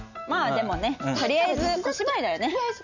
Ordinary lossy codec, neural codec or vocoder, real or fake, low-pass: none; none; real; 7.2 kHz